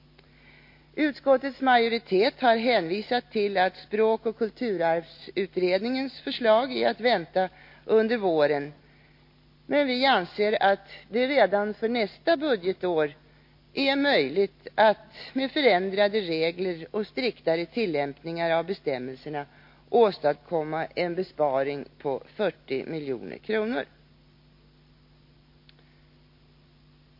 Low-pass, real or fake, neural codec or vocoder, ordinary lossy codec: 5.4 kHz; real; none; MP3, 32 kbps